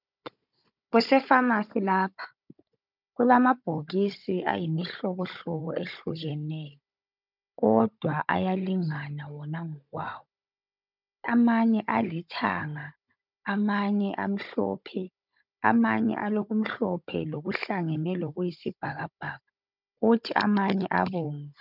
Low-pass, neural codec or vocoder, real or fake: 5.4 kHz; codec, 16 kHz, 16 kbps, FunCodec, trained on Chinese and English, 50 frames a second; fake